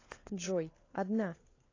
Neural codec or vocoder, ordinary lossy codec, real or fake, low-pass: codec, 16 kHz, 4 kbps, FunCodec, trained on LibriTTS, 50 frames a second; AAC, 32 kbps; fake; 7.2 kHz